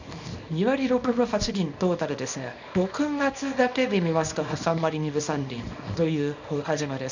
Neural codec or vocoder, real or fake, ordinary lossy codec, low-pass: codec, 24 kHz, 0.9 kbps, WavTokenizer, small release; fake; none; 7.2 kHz